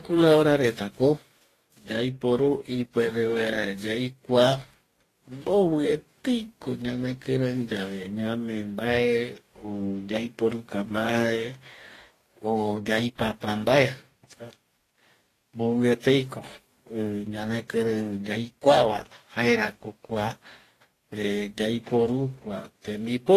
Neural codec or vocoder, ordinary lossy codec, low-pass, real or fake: codec, 44.1 kHz, 2.6 kbps, DAC; AAC, 48 kbps; 14.4 kHz; fake